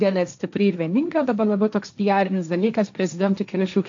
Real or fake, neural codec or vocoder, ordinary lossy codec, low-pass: fake; codec, 16 kHz, 1.1 kbps, Voila-Tokenizer; AAC, 64 kbps; 7.2 kHz